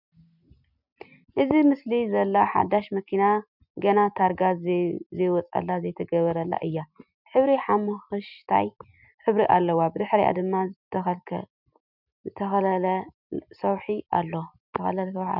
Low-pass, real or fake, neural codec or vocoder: 5.4 kHz; real; none